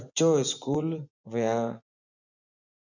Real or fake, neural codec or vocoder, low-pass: fake; vocoder, 24 kHz, 100 mel bands, Vocos; 7.2 kHz